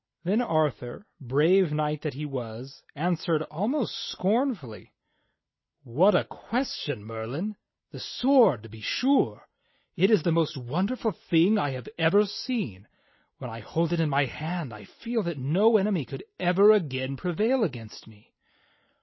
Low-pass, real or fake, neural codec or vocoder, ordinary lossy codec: 7.2 kHz; real; none; MP3, 24 kbps